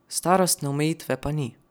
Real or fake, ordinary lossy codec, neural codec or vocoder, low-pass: real; none; none; none